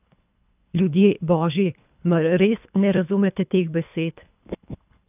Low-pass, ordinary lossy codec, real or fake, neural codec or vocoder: 3.6 kHz; none; fake; codec, 24 kHz, 3 kbps, HILCodec